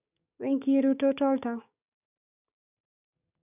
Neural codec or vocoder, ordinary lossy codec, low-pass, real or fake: codec, 16 kHz, 6 kbps, DAC; none; 3.6 kHz; fake